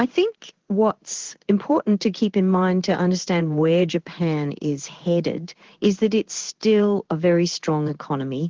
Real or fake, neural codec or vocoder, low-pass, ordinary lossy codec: fake; codec, 16 kHz in and 24 kHz out, 1 kbps, XY-Tokenizer; 7.2 kHz; Opus, 16 kbps